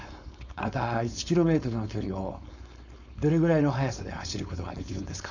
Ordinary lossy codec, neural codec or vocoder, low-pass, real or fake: none; codec, 16 kHz, 4.8 kbps, FACodec; 7.2 kHz; fake